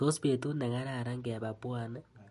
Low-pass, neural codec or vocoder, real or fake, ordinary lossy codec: 14.4 kHz; none; real; MP3, 48 kbps